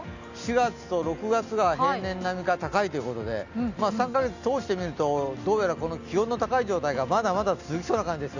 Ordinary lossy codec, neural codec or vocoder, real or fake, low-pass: none; none; real; 7.2 kHz